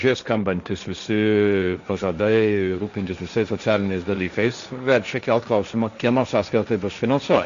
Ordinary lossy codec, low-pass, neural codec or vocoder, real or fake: Opus, 64 kbps; 7.2 kHz; codec, 16 kHz, 1.1 kbps, Voila-Tokenizer; fake